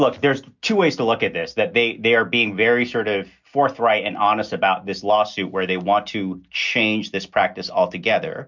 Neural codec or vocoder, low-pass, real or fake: none; 7.2 kHz; real